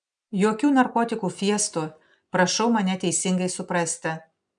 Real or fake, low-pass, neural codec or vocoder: real; 9.9 kHz; none